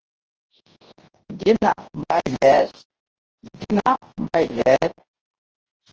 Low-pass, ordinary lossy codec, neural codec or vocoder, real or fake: 7.2 kHz; Opus, 16 kbps; codec, 24 kHz, 0.9 kbps, WavTokenizer, large speech release; fake